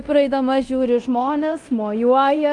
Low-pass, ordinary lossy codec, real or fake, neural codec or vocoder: 10.8 kHz; Opus, 32 kbps; fake; codec, 24 kHz, 0.9 kbps, DualCodec